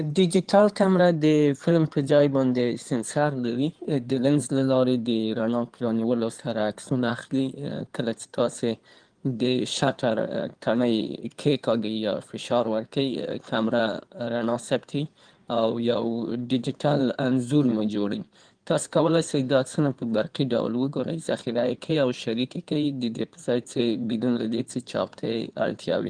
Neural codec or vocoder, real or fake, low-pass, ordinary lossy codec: codec, 16 kHz in and 24 kHz out, 2.2 kbps, FireRedTTS-2 codec; fake; 9.9 kHz; Opus, 24 kbps